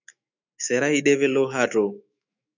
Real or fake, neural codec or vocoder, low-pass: fake; autoencoder, 48 kHz, 128 numbers a frame, DAC-VAE, trained on Japanese speech; 7.2 kHz